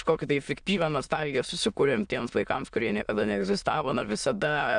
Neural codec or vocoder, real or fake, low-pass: autoencoder, 22.05 kHz, a latent of 192 numbers a frame, VITS, trained on many speakers; fake; 9.9 kHz